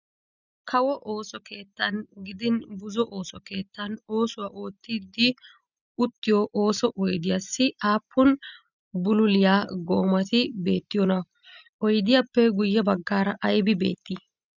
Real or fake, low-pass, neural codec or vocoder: real; 7.2 kHz; none